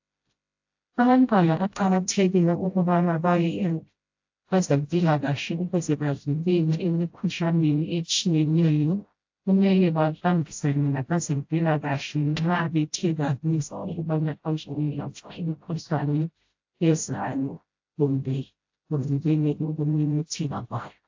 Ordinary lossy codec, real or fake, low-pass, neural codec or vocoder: AAC, 48 kbps; fake; 7.2 kHz; codec, 16 kHz, 0.5 kbps, FreqCodec, smaller model